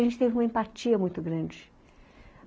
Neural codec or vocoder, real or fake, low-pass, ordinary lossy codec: none; real; none; none